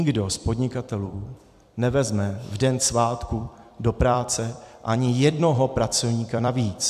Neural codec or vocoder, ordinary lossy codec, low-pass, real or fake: vocoder, 44.1 kHz, 128 mel bands every 256 samples, BigVGAN v2; AAC, 96 kbps; 14.4 kHz; fake